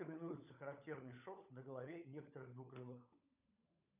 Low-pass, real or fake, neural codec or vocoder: 3.6 kHz; fake; codec, 16 kHz, 16 kbps, FunCodec, trained on LibriTTS, 50 frames a second